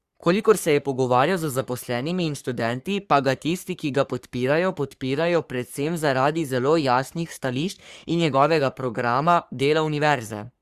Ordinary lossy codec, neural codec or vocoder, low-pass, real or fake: Opus, 64 kbps; codec, 44.1 kHz, 3.4 kbps, Pupu-Codec; 14.4 kHz; fake